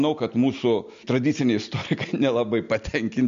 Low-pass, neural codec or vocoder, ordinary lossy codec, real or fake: 7.2 kHz; none; MP3, 48 kbps; real